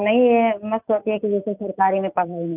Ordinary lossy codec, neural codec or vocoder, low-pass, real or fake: none; none; 3.6 kHz; real